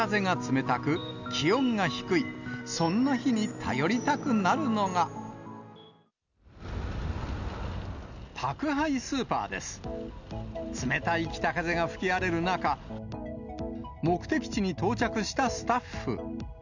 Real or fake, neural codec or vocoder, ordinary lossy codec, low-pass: real; none; none; 7.2 kHz